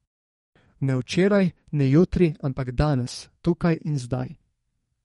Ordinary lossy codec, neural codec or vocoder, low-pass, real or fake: MP3, 48 kbps; codec, 32 kHz, 1.9 kbps, SNAC; 14.4 kHz; fake